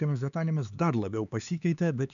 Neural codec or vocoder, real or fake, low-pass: codec, 16 kHz, 4 kbps, X-Codec, HuBERT features, trained on LibriSpeech; fake; 7.2 kHz